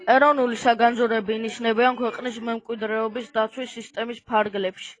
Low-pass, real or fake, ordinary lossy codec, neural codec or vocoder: 9.9 kHz; real; AAC, 32 kbps; none